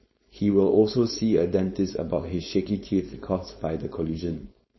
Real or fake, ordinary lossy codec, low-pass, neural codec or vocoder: fake; MP3, 24 kbps; 7.2 kHz; codec, 16 kHz, 4.8 kbps, FACodec